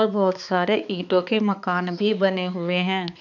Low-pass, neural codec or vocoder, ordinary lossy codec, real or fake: 7.2 kHz; codec, 16 kHz, 4 kbps, X-Codec, HuBERT features, trained on balanced general audio; none; fake